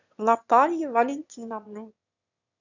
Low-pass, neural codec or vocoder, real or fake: 7.2 kHz; autoencoder, 22.05 kHz, a latent of 192 numbers a frame, VITS, trained on one speaker; fake